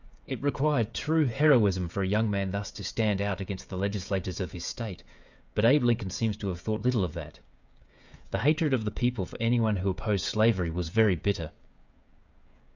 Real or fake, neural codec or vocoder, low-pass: fake; codec, 16 kHz, 16 kbps, FreqCodec, smaller model; 7.2 kHz